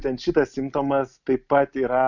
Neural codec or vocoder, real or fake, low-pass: none; real; 7.2 kHz